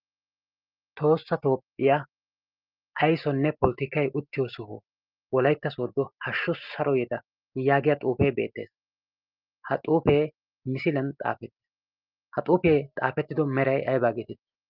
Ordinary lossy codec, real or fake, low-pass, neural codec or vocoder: Opus, 32 kbps; real; 5.4 kHz; none